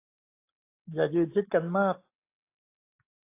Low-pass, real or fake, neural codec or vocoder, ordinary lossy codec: 3.6 kHz; real; none; AAC, 24 kbps